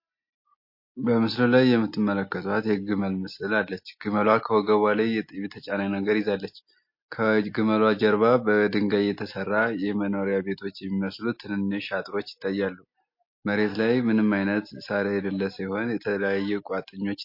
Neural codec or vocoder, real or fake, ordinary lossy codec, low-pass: none; real; MP3, 32 kbps; 5.4 kHz